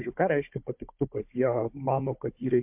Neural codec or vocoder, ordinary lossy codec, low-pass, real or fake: codec, 16 kHz, 4 kbps, FunCodec, trained on Chinese and English, 50 frames a second; MP3, 32 kbps; 3.6 kHz; fake